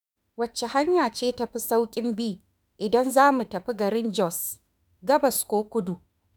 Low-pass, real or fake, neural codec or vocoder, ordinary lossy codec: none; fake; autoencoder, 48 kHz, 32 numbers a frame, DAC-VAE, trained on Japanese speech; none